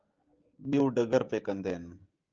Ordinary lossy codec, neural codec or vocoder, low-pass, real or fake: Opus, 24 kbps; codec, 16 kHz, 16 kbps, FreqCodec, smaller model; 7.2 kHz; fake